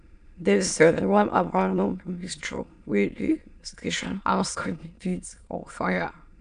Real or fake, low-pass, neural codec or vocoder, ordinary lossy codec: fake; 9.9 kHz; autoencoder, 22.05 kHz, a latent of 192 numbers a frame, VITS, trained on many speakers; none